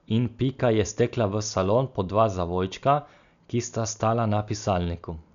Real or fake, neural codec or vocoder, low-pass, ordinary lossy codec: real; none; 7.2 kHz; none